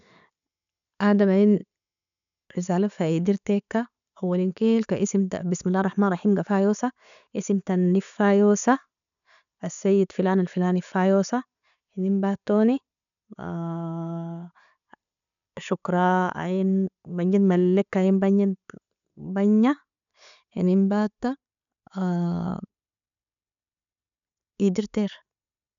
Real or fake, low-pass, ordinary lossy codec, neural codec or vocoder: real; 7.2 kHz; none; none